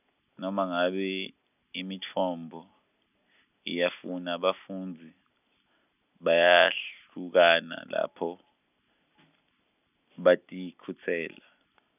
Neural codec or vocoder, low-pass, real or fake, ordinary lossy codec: none; 3.6 kHz; real; none